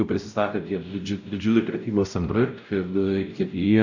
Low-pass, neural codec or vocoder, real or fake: 7.2 kHz; codec, 16 kHz, 0.5 kbps, X-Codec, WavLM features, trained on Multilingual LibriSpeech; fake